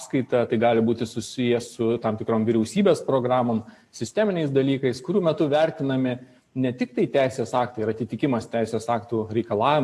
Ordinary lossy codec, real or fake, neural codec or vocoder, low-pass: AAC, 64 kbps; real; none; 14.4 kHz